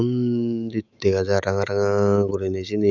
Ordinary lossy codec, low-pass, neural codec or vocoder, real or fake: none; 7.2 kHz; autoencoder, 48 kHz, 128 numbers a frame, DAC-VAE, trained on Japanese speech; fake